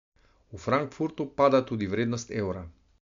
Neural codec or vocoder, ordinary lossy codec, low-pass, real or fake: none; MP3, 64 kbps; 7.2 kHz; real